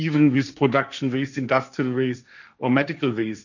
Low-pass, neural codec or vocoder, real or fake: 7.2 kHz; codec, 16 kHz, 1.1 kbps, Voila-Tokenizer; fake